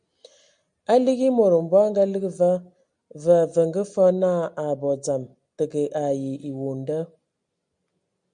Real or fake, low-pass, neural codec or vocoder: real; 9.9 kHz; none